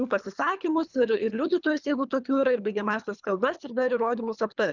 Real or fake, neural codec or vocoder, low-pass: fake; codec, 24 kHz, 3 kbps, HILCodec; 7.2 kHz